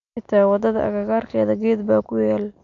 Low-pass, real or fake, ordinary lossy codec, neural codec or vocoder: 7.2 kHz; real; none; none